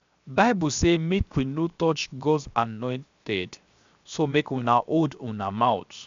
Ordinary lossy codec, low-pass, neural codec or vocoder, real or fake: MP3, 64 kbps; 7.2 kHz; codec, 16 kHz, 0.7 kbps, FocalCodec; fake